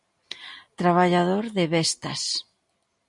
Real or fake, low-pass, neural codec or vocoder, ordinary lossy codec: real; 10.8 kHz; none; MP3, 48 kbps